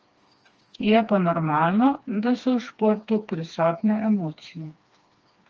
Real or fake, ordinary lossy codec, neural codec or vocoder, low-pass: fake; Opus, 24 kbps; codec, 16 kHz, 2 kbps, FreqCodec, smaller model; 7.2 kHz